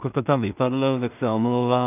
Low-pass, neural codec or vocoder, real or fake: 3.6 kHz; codec, 16 kHz in and 24 kHz out, 0.4 kbps, LongCat-Audio-Codec, two codebook decoder; fake